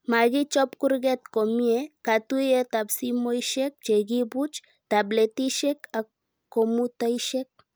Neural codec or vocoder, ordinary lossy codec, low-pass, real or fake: none; none; none; real